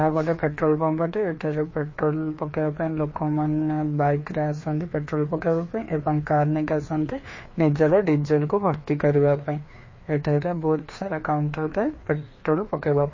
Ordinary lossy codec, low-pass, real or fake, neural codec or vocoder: MP3, 32 kbps; 7.2 kHz; fake; codec, 16 kHz, 2 kbps, FreqCodec, larger model